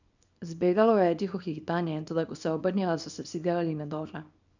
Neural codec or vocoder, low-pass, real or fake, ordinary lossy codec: codec, 24 kHz, 0.9 kbps, WavTokenizer, small release; 7.2 kHz; fake; none